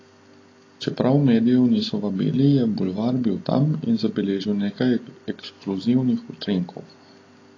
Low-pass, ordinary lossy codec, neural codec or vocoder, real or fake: 7.2 kHz; AAC, 32 kbps; none; real